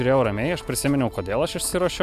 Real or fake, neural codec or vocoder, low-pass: real; none; 14.4 kHz